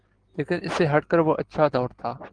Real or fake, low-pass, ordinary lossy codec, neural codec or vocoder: real; 9.9 kHz; Opus, 16 kbps; none